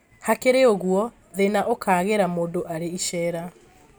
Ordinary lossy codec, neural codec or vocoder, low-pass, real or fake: none; none; none; real